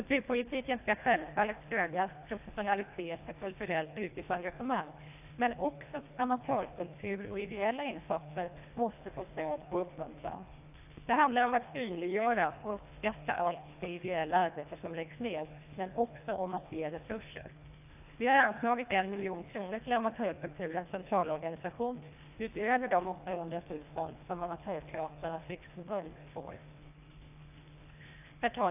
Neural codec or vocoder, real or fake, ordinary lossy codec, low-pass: codec, 24 kHz, 1.5 kbps, HILCodec; fake; AAC, 32 kbps; 3.6 kHz